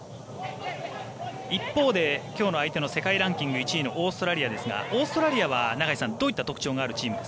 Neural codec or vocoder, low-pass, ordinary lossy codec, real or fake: none; none; none; real